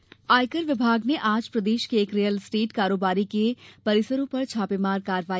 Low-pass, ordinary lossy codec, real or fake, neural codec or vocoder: none; none; real; none